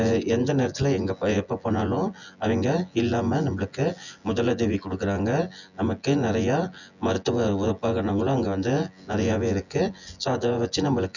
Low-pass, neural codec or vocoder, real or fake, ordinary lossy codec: 7.2 kHz; vocoder, 24 kHz, 100 mel bands, Vocos; fake; none